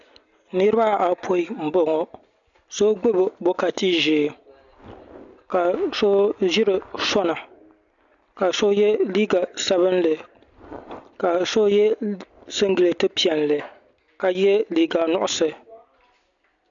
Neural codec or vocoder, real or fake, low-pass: none; real; 7.2 kHz